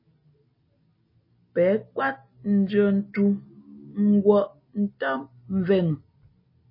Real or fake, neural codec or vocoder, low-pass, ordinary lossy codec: real; none; 5.4 kHz; MP3, 24 kbps